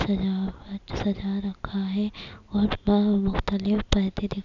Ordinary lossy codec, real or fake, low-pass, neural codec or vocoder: none; real; 7.2 kHz; none